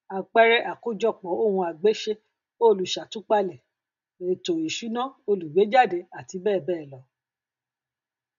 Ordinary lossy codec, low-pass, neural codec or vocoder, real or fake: none; 7.2 kHz; none; real